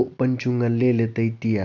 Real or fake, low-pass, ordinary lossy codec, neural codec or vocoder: real; 7.2 kHz; none; none